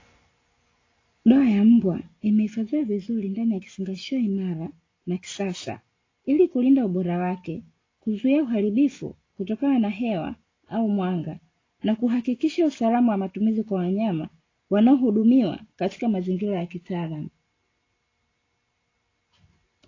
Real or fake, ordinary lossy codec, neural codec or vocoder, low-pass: real; AAC, 32 kbps; none; 7.2 kHz